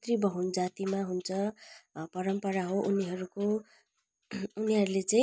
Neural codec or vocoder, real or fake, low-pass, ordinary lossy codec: none; real; none; none